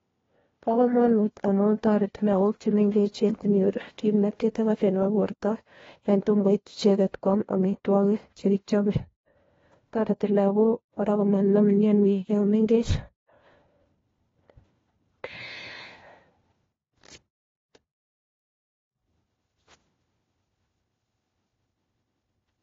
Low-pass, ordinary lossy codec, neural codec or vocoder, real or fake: 7.2 kHz; AAC, 24 kbps; codec, 16 kHz, 1 kbps, FunCodec, trained on LibriTTS, 50 frames a second; fake